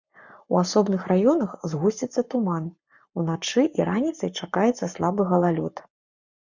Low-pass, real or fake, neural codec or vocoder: 7.2 kHz; fake; codec, 44.1 kHz, 7.8 kbps, Pupu-Codec